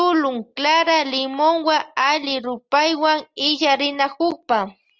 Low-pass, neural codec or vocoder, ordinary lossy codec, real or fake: 7.2 kHz; none; Opus, 24 kbps; real